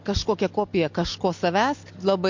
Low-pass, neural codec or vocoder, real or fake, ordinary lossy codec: 7.2 kHz; none; real; MP3, 48 kbps